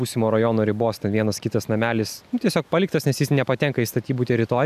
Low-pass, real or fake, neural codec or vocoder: 14.4 kHz; real; none